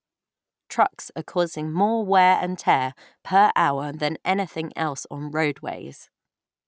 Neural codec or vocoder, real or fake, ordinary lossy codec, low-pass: none; real; none; none